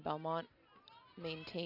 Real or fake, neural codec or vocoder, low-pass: real; none; 5.4 kHz